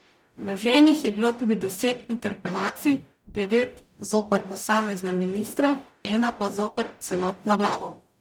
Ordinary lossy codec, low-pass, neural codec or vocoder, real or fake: none; none; codec, 44.1 kHz, 0.9 kbps, DAC; fake